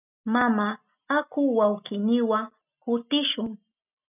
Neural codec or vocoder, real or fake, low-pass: none; real; 3.6 kHz